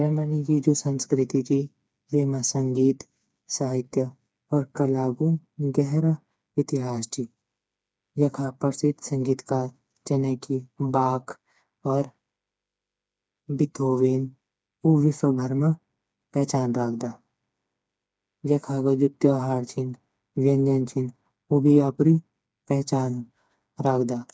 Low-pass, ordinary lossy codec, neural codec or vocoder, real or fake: none; none; codec, 16 kHz, 4 kbps, FreqCodec, smaller model; fake